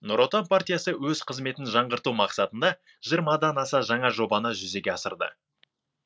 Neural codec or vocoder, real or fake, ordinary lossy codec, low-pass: none; real; none; none